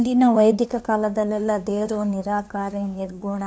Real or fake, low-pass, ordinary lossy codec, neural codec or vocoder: fake; none; none; codec, 16 kHz, 2 kbps, FunCodec, trained on LibriTTS, 25 frames a second